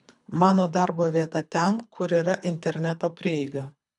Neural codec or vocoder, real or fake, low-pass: codec, 24 kHz, 3 kbps, HILCodec; fake; 10.8 kHz